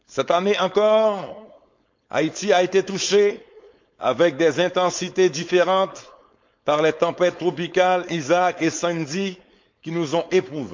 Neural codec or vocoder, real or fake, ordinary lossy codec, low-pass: codec, 16 kHz, 4.8 kbps, FACodec; fake; MP3, 64 kbps; 7.2 kHz